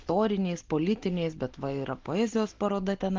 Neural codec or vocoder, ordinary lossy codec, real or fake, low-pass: none; Opus, 16 kbps; real; 7.2 kHz